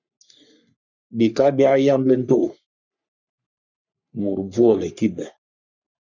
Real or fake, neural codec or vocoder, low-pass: fake; codec, 44.1 kHz, 3.4 kbps, Pupu-Codec; 7.2 kHz